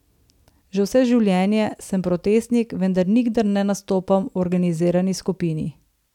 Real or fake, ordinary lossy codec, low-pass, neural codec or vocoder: real; none; 19.8 kHz; none